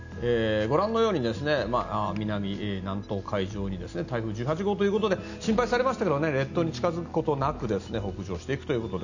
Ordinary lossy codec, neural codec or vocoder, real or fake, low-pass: none; none; real; 7.2 kHz